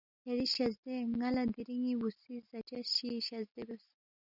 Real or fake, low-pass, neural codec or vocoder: real; 5.4 kHz; none